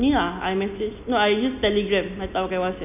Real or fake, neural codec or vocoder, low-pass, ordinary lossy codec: real; none; 3.6 kHz; none